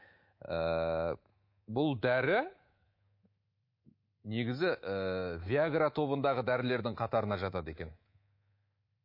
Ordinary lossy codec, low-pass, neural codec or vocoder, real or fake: MP3, 32 kbps; 5.4 kHz; codec, 24 kHz, 3.1 kbps, DualCodec; fake